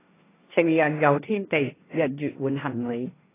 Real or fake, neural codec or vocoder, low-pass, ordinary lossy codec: fake; codec, 16 kHz, 1.1 kbps, Voila-Tokenizer; 3.6 kHz; AAC, 16 kbps